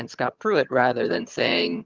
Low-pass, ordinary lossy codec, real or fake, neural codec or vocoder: 7.2 kHz; Opus, 24 kbps; fake; vocoder, 22.05 kHz, 80 mel bands, HiFi-GAN